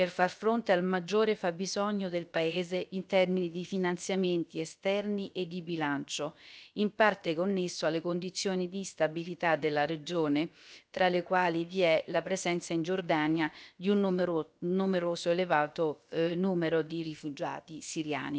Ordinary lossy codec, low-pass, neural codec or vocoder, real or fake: none; none; codec, 16 kHz, about 1 kbps, DyCAST, with the encoder's durations; fake